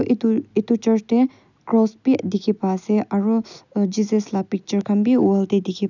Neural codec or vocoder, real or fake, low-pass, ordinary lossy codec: none; real; 7.2 kHz; none